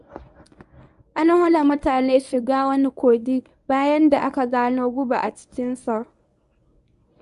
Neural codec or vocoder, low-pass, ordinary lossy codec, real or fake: codec, 24 kHz, 0.9 kbps, WavTokenizer, medium speech release version 1; 10.8 kHz; none; fake